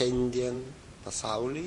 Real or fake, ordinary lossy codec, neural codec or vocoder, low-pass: fake; MP3, 48 kbps; vocoder, 44.1 kHz, 128 mel bands every 512 samples, BigVGAN v2; 10.8 kHz